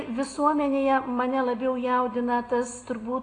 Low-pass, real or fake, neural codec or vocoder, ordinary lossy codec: 10.8 kHz; real; none; AAC, 32 kbps